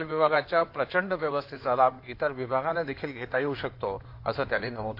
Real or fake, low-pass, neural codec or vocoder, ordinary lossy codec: fake; 5.4 kHz; codec, 16 kHz in and 24 kHz out, 2.2 kbps, FireRedTTS-2 codec; AAC, 32 kbps